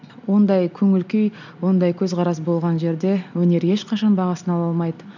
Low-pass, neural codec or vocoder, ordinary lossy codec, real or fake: 7.2 kHz; none; none; real